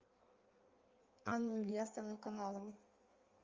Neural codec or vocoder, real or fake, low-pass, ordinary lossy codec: codec, 16 kHz in and 24 kHz out, 1.1 kbps, FireRedTTS-2 codec; fake; 7.2 kHz; Opus, 32 kbps